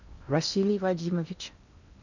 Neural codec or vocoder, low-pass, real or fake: codec, 16 kHz in and 24 kHz out, 0.8 kbps, FocalCodec, streaming, 65536 codes; 7.2 kHz; fake